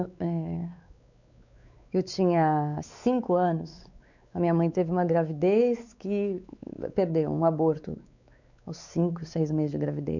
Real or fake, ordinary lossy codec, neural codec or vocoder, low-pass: fake; none; codec, 16 kHz, 4 kbps, X-Codec, WavLM features, trained on Multilingual LibriSpeech; 7.2 kHz